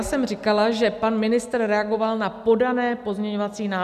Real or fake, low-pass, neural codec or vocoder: real; 14.4 kHz; none